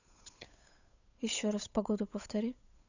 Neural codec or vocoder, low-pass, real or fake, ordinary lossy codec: codec, 16 kHz, 8 kbps, FunCodec, trained on Chinese and English, 25 frames a second; 7.2 kHz; fake; none